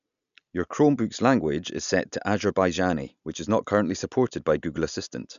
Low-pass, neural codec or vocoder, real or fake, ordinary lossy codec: 7.2 kHz; none; real; none